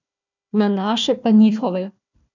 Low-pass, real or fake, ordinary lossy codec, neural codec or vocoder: 7.2 kHz; fake; none; codec, 16 kHz, 1 kbps, FunCodec, trained on Chinese and English, 50 frames a second